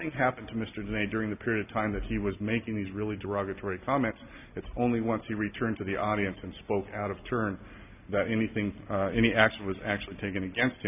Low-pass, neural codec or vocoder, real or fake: 3.6 kHz; none; real